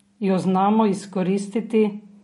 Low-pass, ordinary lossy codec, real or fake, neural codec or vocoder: 19.8 kHz; MP3, 48 kbps; real; none